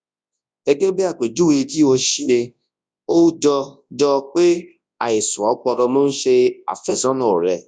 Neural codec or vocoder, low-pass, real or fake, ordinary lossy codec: codec, 24 kHz, 0.9 kbps, WavTokenizer, large speech release; 9.9 kHz; fake; none